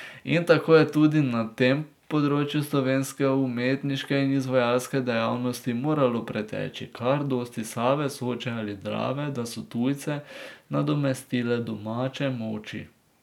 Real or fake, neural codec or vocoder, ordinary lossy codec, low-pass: real; none; none; 19.8 kHz